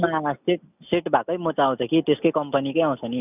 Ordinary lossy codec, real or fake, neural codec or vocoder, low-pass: none; real; none; 3.6 kHz